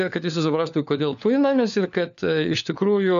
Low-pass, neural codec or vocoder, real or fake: 7.2 kHz; codec, 16 kHz, 4 kbps, FunCodec, trained on LibriTTS, 50 frames a second; fake